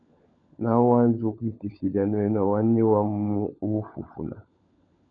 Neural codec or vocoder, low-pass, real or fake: codec, 16 kHz, 16 kbps, FunCodec, trained on LibriTTS, 50 frames a second; 7.2 kHz; fake